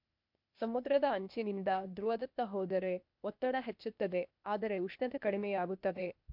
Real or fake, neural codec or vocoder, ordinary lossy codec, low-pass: fake; codec, 16 kHz, 0.8 kbps, ZipCodec; MP3, 48 kbps; 5.4 kHz